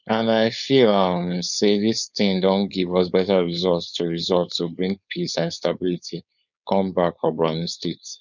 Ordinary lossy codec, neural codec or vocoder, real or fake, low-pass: none; codec, 16 kHz, 4.8 kbps, FACodec; fake; 7.2 kHz